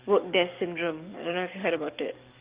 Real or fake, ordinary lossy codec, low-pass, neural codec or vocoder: fake; Opus, 24 kbps; 3.6 kHz; codec, 44.1 kHz, 7.8 kbps, DAC